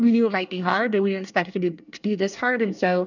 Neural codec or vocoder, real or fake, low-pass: codec, 24 kHz, 1 kbps, SNAC; fake; 7.2 kHz